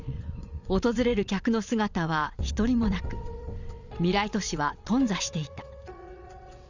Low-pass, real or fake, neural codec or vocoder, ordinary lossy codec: 7.2 kHz; fake; vocoder, 22.05 kHz, 80 mel bands, WaveNeXt; none